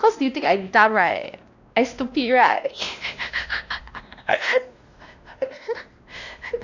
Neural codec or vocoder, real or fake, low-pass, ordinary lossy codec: codec, 16 kHz, 1 kbps, X-Codec, WavLM features, trained on Multilingual LibriSpeech; fake; 7.2 kHz; none